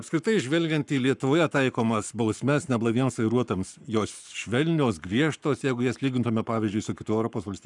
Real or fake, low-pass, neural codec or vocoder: fake; 10.8 kHz; codec, 44.1 kHz, 7.8 kbps, Pupu-Codec